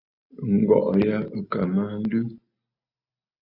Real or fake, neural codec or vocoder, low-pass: real; none; 5.4 kHz